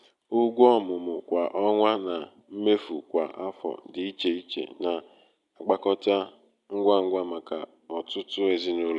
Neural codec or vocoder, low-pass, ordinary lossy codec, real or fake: none; 10.8 kHz; none; real